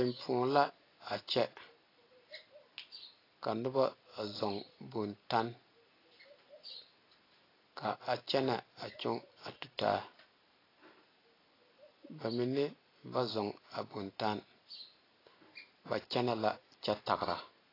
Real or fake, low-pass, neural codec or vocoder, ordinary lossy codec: real; 5.4 kHz; none; AAC, 24 kbps